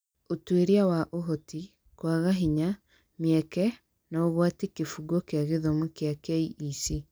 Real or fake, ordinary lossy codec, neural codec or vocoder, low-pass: real; none; none; none